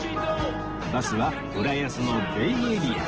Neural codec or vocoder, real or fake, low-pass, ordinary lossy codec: none; real; 7.2 kHz; Opus, 16 kbps